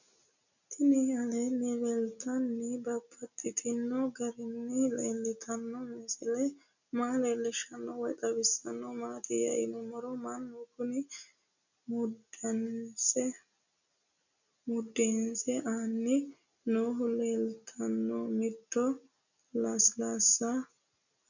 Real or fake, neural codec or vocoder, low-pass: real; none; 7.2 kHz